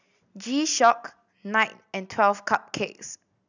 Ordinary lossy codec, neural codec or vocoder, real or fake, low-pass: none; none; real; 7.2 kHz